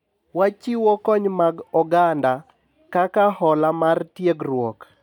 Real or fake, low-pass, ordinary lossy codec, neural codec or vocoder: real; 19.8 kHz; none; none